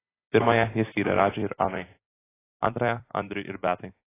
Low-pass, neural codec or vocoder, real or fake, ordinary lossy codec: 3.6 kHz; vocoder, 44.1 kHz, 128 mel bands every 512 samples, BigVGAN v2; fake; AAC, 16 kbps